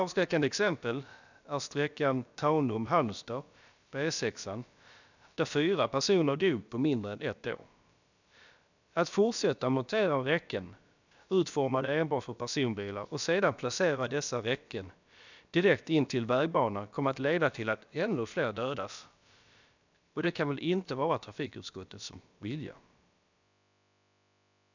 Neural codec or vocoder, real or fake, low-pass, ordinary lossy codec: codec, 16 kHz, about 1 kbps, DyCAST, with the encoder's durations; fake; 7.2 kHz; none